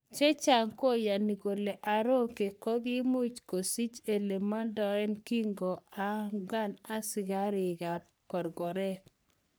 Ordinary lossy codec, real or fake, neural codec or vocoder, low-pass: none; fake; codec, 44.1 kHz, 3.4 kbps, Pupu-Codec; none